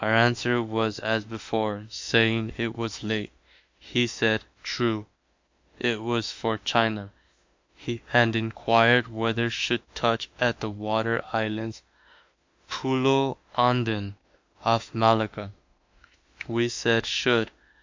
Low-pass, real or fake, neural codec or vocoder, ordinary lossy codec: 7.2 kHz; fake; autoencoder, 48 kHz, 32 numbers a frame, DAC-VAE, trained on Japanese speech; MP3, 64 kbps